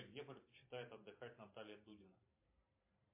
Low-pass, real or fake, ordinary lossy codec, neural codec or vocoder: 3.6 kHz; fake; MP3, 24 kbps; vocoder, 44.1 kHz, 128 mel bands every 512 samples, BigVGAN v2